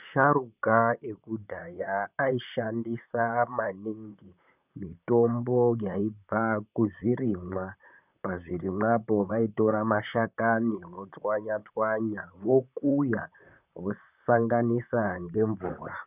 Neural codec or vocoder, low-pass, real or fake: vocoder, 44.1 kHz, 128 mel bands, Pupu-Vocoder; 3.6 kHz; fake